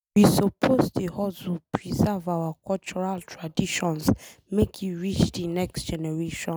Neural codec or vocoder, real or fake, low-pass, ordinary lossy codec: none; real; none; none